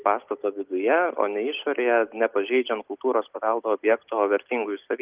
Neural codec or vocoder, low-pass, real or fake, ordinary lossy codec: none; 3.6 kHz; real; Opus, 24 kbps